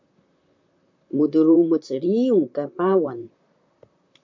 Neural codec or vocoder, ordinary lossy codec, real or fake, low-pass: vocoder, 44.1 kHz, 128 mel bands, Pupu-Vocoder; MP3, 48 kbps; fake; 7.2 kHz